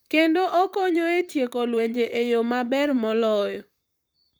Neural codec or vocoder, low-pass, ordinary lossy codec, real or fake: vocoder, 44.1 kHz, 128 mel bands, Pupu-Vocoder; none; none; fake